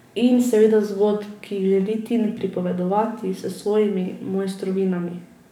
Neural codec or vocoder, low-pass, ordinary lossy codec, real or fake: codec, 44.1 kHz, 7.8 kbps, DAC; 19.8 kHz; none; fake